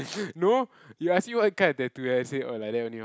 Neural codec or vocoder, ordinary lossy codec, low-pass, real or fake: none; none; none; real